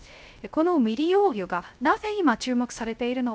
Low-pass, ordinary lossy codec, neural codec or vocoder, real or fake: none; none; codec, 16 kHz, 0.3 kbps, FocalCodec; fake